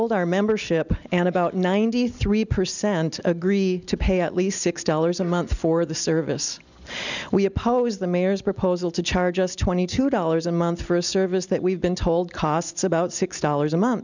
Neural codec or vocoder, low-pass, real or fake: none; 7.2 kHz; real